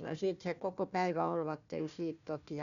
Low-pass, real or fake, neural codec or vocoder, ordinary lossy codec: 7.2 kHz; fake; codec, 16 kHz, 1 kbps, FunCodec, trained on Chinese and English, 50 frames a second; none